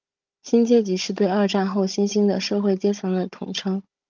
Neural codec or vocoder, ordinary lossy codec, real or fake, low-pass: codec, 16 kHz, 16 kbps, FunCodec, trained on Chinese and English, 50 frames a second; Opus, 24 kbps; fake; 7.2 kHz